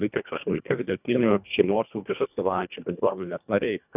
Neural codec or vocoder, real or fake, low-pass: codec, 24 kHz, 1.5 kbps, HILCodec; fake; 3.6 kHz